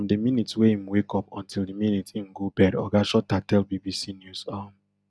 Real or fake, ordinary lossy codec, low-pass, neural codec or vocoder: real; none; none; none